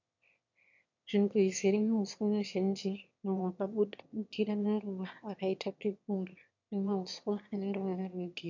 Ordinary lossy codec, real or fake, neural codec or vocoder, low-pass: MP3, 48 kbps; fake; autoencoder, 22.05 kHz, a latent of 192 numbers a frame, VITS, trained on one speaker; 7.2 kHz